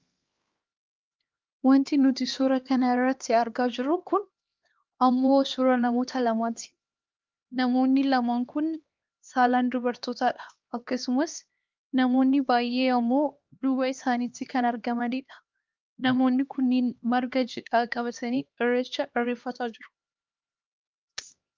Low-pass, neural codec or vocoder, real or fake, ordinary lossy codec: 7.2 kHz; codec, 16 kHz, 2 kbps, X-Codec, HuBERT features, trained on LibriSpeech; fake; Opus, 32 kbps